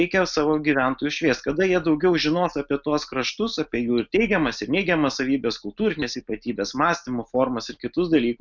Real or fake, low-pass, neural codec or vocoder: real; 7.2 kHz; none